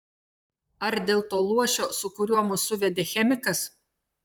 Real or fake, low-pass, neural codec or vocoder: fake; 19.8 kHz; vocoder, 44.1 kHz, 128 mel bands, Pupu-Vocoder